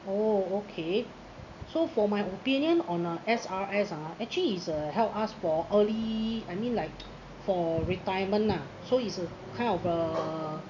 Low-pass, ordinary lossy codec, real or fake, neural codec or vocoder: 7.2 kHz; none; real; none